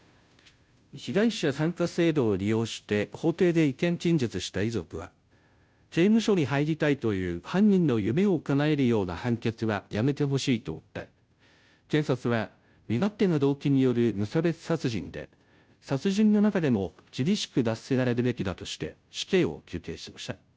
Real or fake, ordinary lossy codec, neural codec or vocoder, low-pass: fake; none; codec, 16 kHz, 0.5 kbps, FunCodec, trained on Chinese and English, 25 frames a second; none